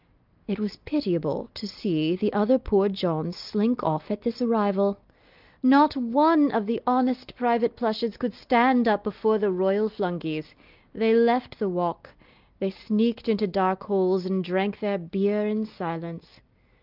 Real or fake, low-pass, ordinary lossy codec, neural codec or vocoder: real; 5.4 kHz; Opus, 24 kbps; none